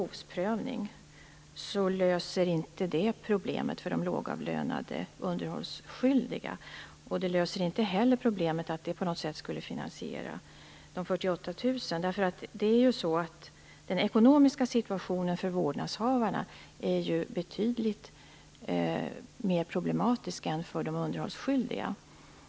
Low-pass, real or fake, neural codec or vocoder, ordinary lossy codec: none; real; none; none